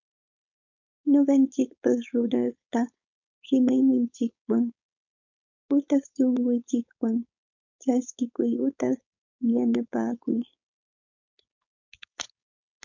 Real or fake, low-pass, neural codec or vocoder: fake; 7.2 kHz; codec, 16 kHz, 4.8 kbps, FACodec